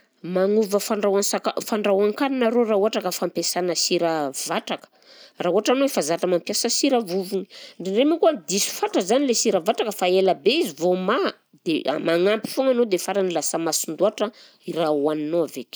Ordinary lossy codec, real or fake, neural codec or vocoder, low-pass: none; real; none; none